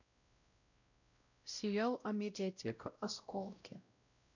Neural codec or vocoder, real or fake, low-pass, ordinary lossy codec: codec, 16 kHz, 0.5 kbps, X-Codec, WavLM features, trained on Multilingual LibriSpeech; fake; 7.2 kHz; none